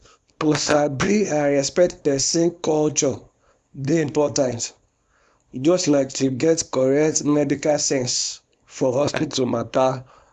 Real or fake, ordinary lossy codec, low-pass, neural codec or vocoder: fake; none; 10.8 kHz; codec, 24 kHz, 0.9 kbps, WavTokenizer, small release